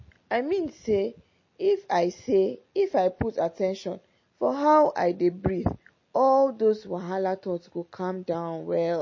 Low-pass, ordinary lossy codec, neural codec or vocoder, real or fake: 7.2 kHz; MP3, 32 kbps; none; real